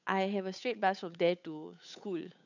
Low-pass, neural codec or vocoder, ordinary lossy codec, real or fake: 7.2 kHz; codec, 16 kHz, 8 kbps, FunCodec, trained on Chinese and English, 25 frames a second; none; fake